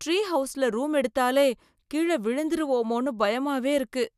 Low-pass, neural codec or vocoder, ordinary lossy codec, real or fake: 14.4 kHz; none; none; real